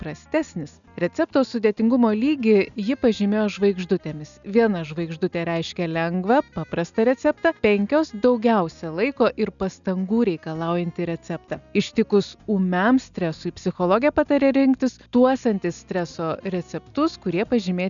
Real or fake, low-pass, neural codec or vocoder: real; 7.2 kHz; none